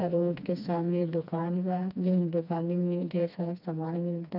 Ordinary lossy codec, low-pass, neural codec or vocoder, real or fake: none; 5.4 kHz; codec, 16 kHz, 2 kbps, FreqCodec, smaller model; fake